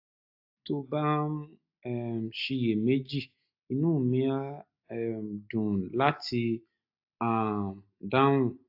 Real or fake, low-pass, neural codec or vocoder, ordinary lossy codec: real; 5.4 kHz; none; AAC, 48 kbps